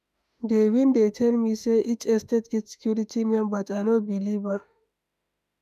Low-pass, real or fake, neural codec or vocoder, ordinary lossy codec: 14.4 kHz; fake; autoencoder, 48 kHz, 32 numbers a frame, DAC-VAE, trained on Japanese speech; none